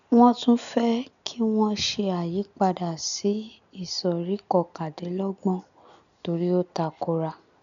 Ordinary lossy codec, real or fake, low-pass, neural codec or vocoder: none; real; 7.2 kHz; none